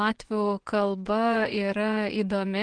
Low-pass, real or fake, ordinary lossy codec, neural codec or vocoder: 9.9 kHz; fake; Opus, 16 kbps; vocoder, 24 kHz, 100 mel bands, Vocos